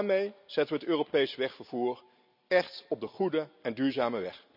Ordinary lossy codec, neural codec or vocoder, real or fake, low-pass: none; none; real; 5.4 kHz